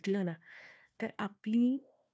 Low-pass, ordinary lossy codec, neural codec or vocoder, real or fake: none; none; codec, 16 kHz, 1 kbps, FunCodec, trained on Chinese and English, 50 frames a second; fake